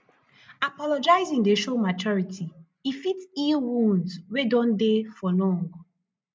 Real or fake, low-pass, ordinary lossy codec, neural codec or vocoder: real; none; none; none